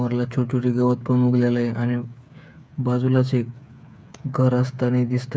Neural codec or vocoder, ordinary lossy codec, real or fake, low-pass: codec, 16 kHz, 8 kbps, FreqCodec, smaller model; none; fake; none